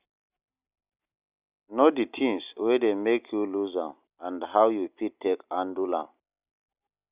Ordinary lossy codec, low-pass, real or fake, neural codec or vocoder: Opus, 64 kbps; 3.6 kHz; real; none